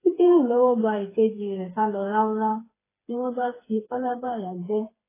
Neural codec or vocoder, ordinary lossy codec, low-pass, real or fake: codec, 16 kHz, 4 kbps, FreqCodec, smaller model; AAC, 16 kbps; 3.6 kHz; fake